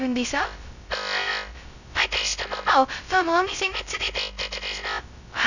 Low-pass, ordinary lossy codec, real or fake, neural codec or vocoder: 7.2 kHz; none; fake; codec, 16 kHz, 0.2 kbps, FocalCodec